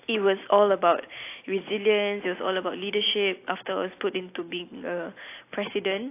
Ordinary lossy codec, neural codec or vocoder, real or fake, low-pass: AAC, 24 kbps; none; real; 3.6 kHz